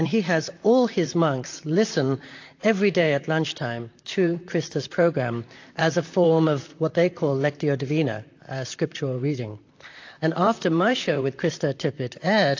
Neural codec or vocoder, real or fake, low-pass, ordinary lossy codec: vocoder, 44.1 kHz, 128 mel bands, Pupu-Vocoder; fake; 7.2 kHz; AAC, 48 kbps